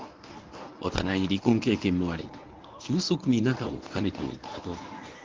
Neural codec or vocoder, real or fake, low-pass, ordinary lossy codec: codec, 24 kHz, 0.9 kbps, WavTokenizer, medium speech release version 1; fake; 7.2 kHz; Opus, 24 kbps